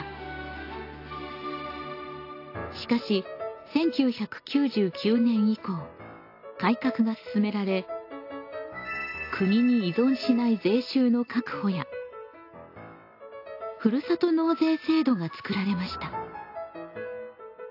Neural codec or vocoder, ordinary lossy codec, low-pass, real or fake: none; AAC, 32 kbps; 5.4 kHz; real